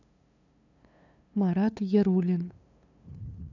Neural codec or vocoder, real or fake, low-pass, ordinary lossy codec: codec, 16 kHz, 2 kbps, FunCodec, trained on LibriTTS, 25 frames a second; fake; 7.2 kHz; none